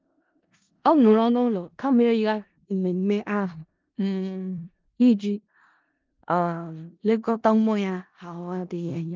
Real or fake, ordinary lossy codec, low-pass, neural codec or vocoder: fake; Opus, 24 kbps; 7.2 kHz; codec, 16 kHz in and 24 kHz out, 0.4 kbps, LongCat-Audio-Codec, four codebook decoder